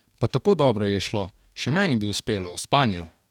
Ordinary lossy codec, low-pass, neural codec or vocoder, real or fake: none; 19.8 kHz; codec, 44.1 kHz, 2.6 kbps, DAC; fake